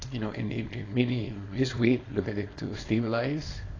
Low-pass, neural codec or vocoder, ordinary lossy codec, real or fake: 7.2 kHz; codec, 24 kHz, 0.9 kbps, WavTokenizer, small release; AAC, 32 kbps; fake